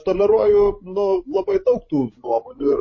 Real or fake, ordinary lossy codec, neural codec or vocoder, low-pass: fake; MP3, 32 kbps; codec, 16 kHz, 16 kbps, FreqCodec, larger model; 7.2 kHz